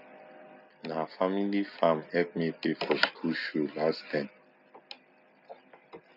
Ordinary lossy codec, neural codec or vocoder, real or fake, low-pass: none; none; real; 5.4 kHz